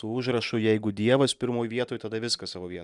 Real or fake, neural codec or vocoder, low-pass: real; none; 10.8 kHz